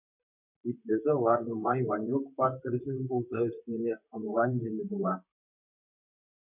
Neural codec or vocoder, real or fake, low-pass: vocoder, 44.1 kHz, 128 mel bands, Pupu-Vocoder; fake; 3.6 kHz